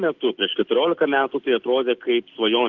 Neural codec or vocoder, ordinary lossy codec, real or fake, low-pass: none; Opus, 16 kbps; real; 7.2 kHz